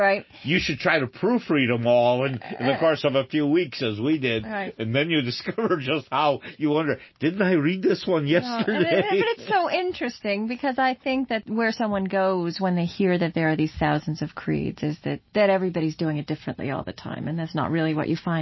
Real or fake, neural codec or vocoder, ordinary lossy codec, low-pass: real; none; MP3, 24 kbps; 7.2 kHz